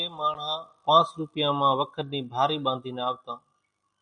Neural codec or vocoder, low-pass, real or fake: none; 9.9 kHz; real